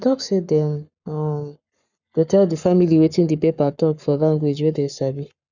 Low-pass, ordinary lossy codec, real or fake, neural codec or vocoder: 7.2 kHz; none; fake; codec, 44.1 kHz, 7.8 kbps, Pupu-Codec